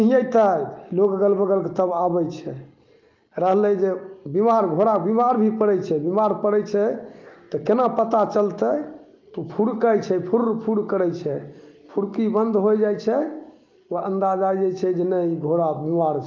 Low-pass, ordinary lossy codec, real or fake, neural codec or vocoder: 7.2 kHz; Opus, 32 kbps; real; none